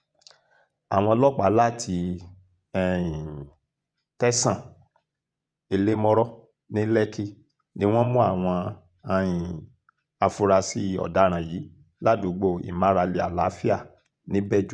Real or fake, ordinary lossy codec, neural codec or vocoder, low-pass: fake; none; vocoder, 24 kHz, 100 mel bands, Vocos; 9.9 kHz